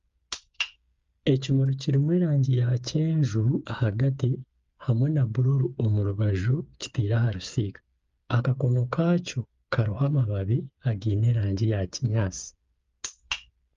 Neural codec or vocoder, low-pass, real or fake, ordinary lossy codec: codec, 16 kHz, 8 kbps, FreqCodec, smaller model; 7.2 kHz; fake; Opus, 32 kbps